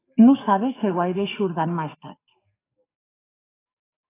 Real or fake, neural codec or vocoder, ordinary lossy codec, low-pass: fake; codec, 44.1 kHz, 7.8 kbps, DAC; AAC, 16 kbps; 3.6 kHz